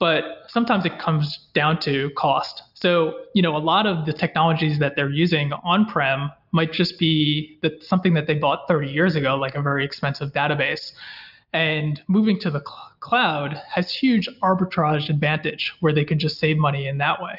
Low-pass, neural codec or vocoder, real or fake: 5.4 kHz; none; real